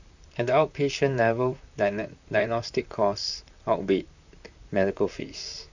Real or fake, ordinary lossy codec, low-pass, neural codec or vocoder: fake; none; 7.2 kHz; vocoder, 44.1 kHz, 128 mel bands, Pupu-Vocoder